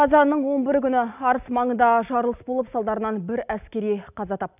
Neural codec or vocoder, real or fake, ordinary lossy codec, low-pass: none; real; none; 3.6 kHz